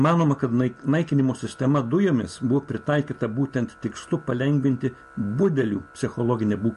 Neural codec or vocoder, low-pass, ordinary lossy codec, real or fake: none; 14.4 kHz; MP3, 48 kbps; real